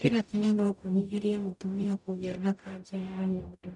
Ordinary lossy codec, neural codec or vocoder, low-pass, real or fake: none; codec, 44.1 kHz, 0.9 kbps, DAC; 10.8 kHz; fake